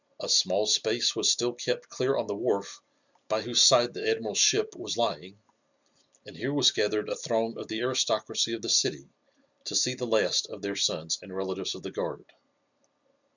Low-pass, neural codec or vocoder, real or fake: 7.2 kHz; none; real